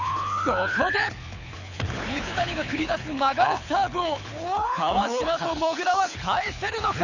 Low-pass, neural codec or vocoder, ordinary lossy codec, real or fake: 7.2 kHz; codec, 24 kHz, 6 kbps, HILCodec; none; fake